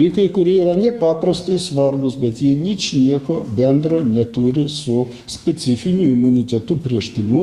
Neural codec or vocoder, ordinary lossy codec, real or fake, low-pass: codec, 32 kHz, 1.9 kbps, SNAC; Opus, 64 kbps; fake; 14.4 kHz